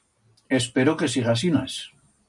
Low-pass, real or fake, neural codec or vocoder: 10.8 kHz; real; none